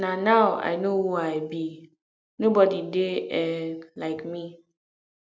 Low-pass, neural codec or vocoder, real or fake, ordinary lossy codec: none; none; real; none